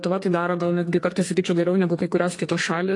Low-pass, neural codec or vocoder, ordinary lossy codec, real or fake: 10.8 kHz; codec, 32 kHz, 1.9 kbps, SNAC; AAC, 48 kbps; fake